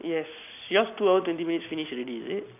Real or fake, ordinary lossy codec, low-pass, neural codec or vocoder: real; none; 3.6 kHz; none